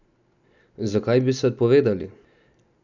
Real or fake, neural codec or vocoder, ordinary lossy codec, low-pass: real; none; none; 7.2 kHz